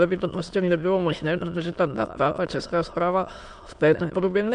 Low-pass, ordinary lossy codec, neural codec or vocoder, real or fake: 9.9 kHz; MP3, 64 kbps; autoencoder, 22.05 kHz, a latent of 192 numbers a frame, VITS, trained on many speakers; fake